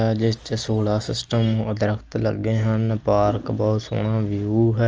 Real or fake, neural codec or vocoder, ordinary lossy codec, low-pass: real; none; Opus, 24 kbps; 7.2 kHz